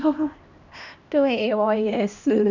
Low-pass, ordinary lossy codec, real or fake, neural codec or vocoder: 7.2 kHz; none; fake; codec, 16 kHz, 1 kbps, X-Codec, HuBERT features, trained on LibriSpeech